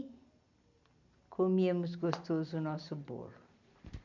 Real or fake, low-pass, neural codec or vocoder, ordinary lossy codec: real; 7.2 kHz; none; none